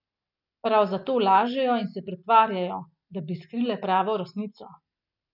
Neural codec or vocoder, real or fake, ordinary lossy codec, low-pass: none; real; none; 5.4 kHz